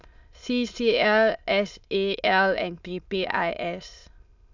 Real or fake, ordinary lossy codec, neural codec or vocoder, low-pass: fake; none; autoencoder, 22.05 kHz, a latent of 192 numbers a frame, VITS, trained on many speakers; 7.2 kHz